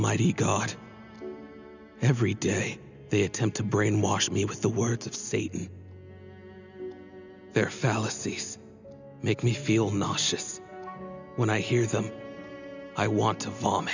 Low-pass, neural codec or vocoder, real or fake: 7.2 kHz; none; real